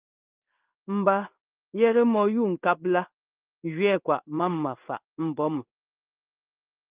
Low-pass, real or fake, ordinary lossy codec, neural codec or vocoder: 3.6 kHz; fake; Opus, 24 kbps; codec, 16 kHz in and 24 kHz out, 1 kbps, XY-Tokenizer